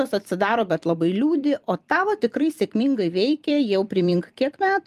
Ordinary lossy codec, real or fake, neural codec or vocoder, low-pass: Opus, 32 kbps; fake; vocoder, 44.1 kHz, 128 mel bands every 256 samples, BigVGAN v2; 14.4 kHz